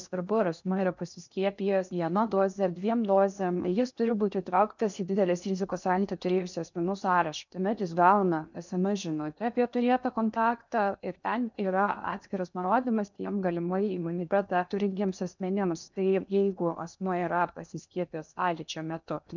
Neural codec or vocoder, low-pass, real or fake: codec, 16 kHz in and 24 kHz out, 0.8 kbps, FocalCodec, streaming, 65536 codes; 7.2 kHz; fake